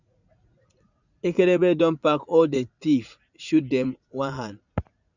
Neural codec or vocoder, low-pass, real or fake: vocoder, 44.1 kHz, 80 mel bands, Vocos; 7.2 kHz; fake